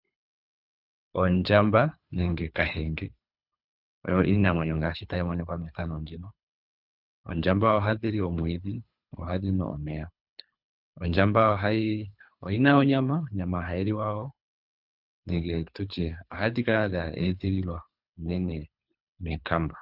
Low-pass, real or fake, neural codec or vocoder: 5.4 kHz; fake; codec, 24 kHz, 3 kbps, HILCodec